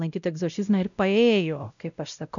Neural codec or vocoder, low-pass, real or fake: codec, 16 kHz, 0.5 kbps, X-Codec, WavLM features, trained on Multilingual LibriSpeech; 7.2 kHz; fake